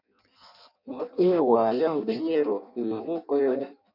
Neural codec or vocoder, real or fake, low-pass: codec, 16 kHz in and 24 kHz out, 0.6 kbps, FireRedTTS-2 codec; fake; 5.4 kHz